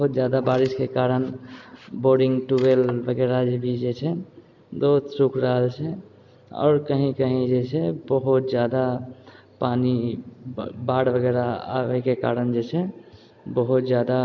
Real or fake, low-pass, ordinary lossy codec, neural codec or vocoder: real; 7.2 kHz; MP3, 64 kbps; none